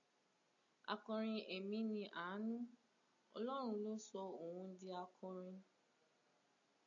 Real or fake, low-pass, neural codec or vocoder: real; 7.2 kHz; none